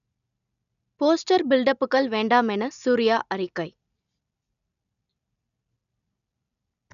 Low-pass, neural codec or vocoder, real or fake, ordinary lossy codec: 7.2 kHz; none; real; none